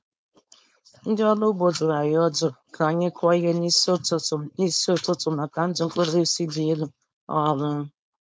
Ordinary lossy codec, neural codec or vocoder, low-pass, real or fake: none; codec, 16 kHz, 4.8 kbps, FACodec; none; fake